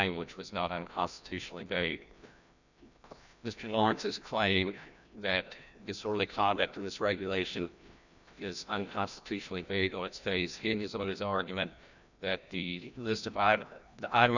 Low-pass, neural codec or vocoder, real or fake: 7.2 kHz; codec, 16 kHz, 1 kbps, FreqCodec, larger model; fake